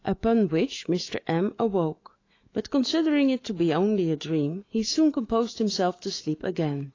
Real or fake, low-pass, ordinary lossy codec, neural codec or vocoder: fake; 7.2 kHz; AAC, 32 kbps; autoencoder, 48 kHz, 128 numbers a frame, DAC-VAE, trained on Japanese speech